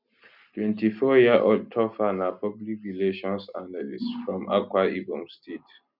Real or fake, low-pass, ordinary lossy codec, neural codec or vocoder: real; 5.4 kHz; none; none